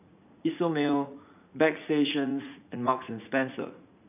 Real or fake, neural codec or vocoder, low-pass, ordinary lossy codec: fake; vocoder, 44.1 kHz, 80 mel bands, Vocos; 3.6 kHz; none